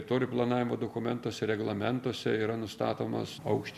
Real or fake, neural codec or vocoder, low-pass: real; none; 14.4 kHz